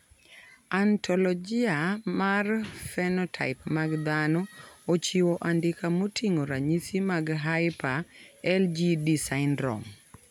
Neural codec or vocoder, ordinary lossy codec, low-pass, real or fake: none; none; 19.8 kHz; real